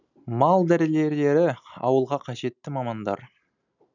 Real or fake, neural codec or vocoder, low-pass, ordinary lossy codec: real; none; 7.2 kHz; none